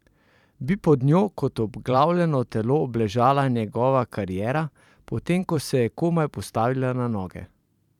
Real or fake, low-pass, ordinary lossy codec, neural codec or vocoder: fake; 19.8 kHz; none; vocoder, 44.1 kHz, 128 mel bands every 512 samples, BigVGAN v2